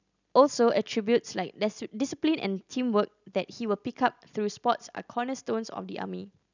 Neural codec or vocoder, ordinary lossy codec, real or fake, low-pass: none; none; real; 7.2 kHz